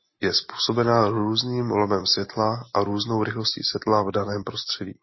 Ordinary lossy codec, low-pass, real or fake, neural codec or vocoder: MP3, 24 kbps; 7.2 kHz; real; none